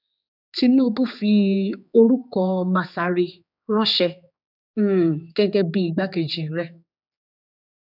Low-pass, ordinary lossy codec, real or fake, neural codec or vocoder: 5.4 kHz; none; fake; codec, 16 kHz, 4 kbps, X-Codec, HuBERT features, trained on general audio